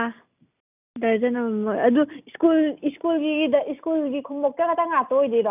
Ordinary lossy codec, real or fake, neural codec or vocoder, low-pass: none; real; none; 3.6 kHz